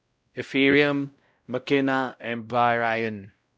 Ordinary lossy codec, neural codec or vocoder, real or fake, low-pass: none; codec, 16 kHz, 0.5 kbps, X-Codec, WavLM features, trained on Multilingual LibriSpeech; fake; none